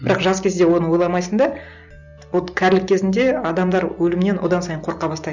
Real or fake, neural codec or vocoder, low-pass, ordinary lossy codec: real; none; 7.2 kHz; none